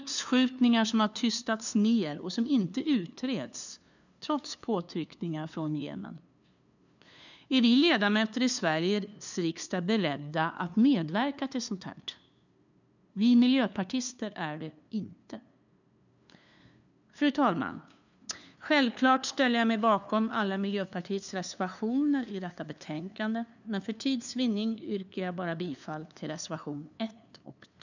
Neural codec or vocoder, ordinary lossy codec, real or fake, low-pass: codec, 16 kHz, 2 kbps, FunCodec, trained on LibriTTS, 25 frames a second; none; fake; 7.2 kHz